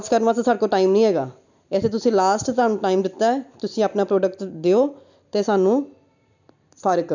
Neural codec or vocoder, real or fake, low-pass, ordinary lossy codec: none; real; 7.2 kHz; none